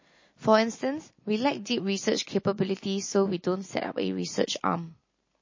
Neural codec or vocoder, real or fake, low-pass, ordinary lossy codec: none; real; 7.2 kHz; MP3, 32 kbps